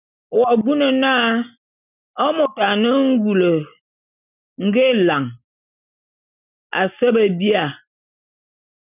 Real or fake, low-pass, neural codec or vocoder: real; 3.6 kHz; none